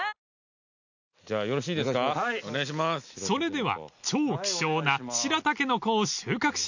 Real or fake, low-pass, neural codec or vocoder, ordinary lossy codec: real; 7.2 kHz; none; none